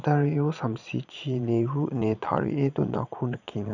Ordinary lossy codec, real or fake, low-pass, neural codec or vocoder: none; fake; 7.2 kHz; vocoder, 22.05 kHz, 80 mel bands, Vocos